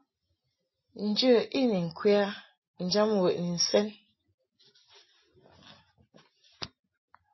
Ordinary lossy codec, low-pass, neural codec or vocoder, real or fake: MP3, 24 kbps; 7.2 kHz; none; real